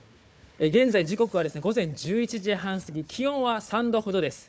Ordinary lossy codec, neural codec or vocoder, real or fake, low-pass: none; codec, 16 kHz, 4 kbps, FunCodec, trained on Chinese and English, 50 frames a second; fake; none